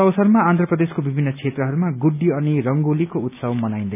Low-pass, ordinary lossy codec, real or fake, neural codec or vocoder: 3.6 kHz; none; real; none